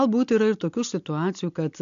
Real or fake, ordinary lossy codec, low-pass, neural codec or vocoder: real; MP3, 64 kbps; 7.2 kHz; none